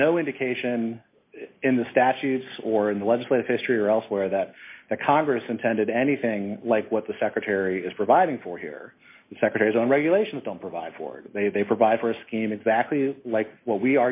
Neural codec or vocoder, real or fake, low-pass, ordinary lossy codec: none; real; 3.6 kHz; MP3, 24 kbps